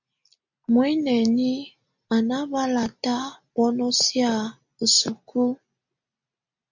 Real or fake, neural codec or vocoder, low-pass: real; none; 7.2 kHz